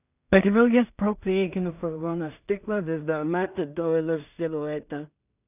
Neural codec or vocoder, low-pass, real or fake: codec, 16 kHz in and 24 kHz out, 0.4 kbps, LongCat-Audio-Codec, two codebook decoder; 3.6 kHz; fake